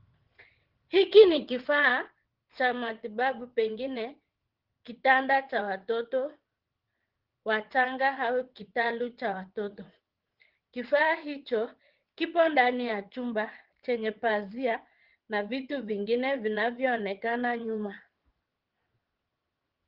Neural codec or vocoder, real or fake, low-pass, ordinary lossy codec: vocoder, 22.05 kHz, 80 mel bands, WaveNeXt; fake; 5.4 kHz; Opus, 16 kbps